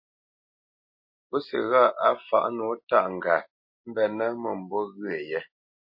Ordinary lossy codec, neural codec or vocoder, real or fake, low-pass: MP3, 32 kbps; none; real; 5.4 kHz